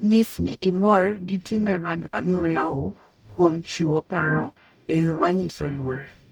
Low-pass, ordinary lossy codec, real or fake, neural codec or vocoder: 19.8 kHz; none; fake; codec, 44.1 kHz, 0.9 kbps, DAC